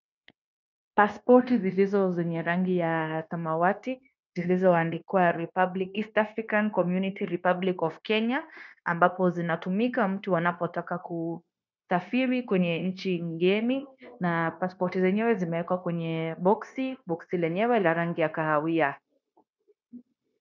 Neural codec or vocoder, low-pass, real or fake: codec, 16 kHz, 0.9 kbps, LongCat-Audio-Codec; 7.2 kHz; fake